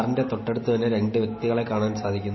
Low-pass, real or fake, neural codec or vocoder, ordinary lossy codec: 7.2 kHz; real; none; MP3, 24 kbps